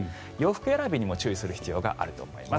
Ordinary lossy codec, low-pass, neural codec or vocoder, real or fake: none; none; none; real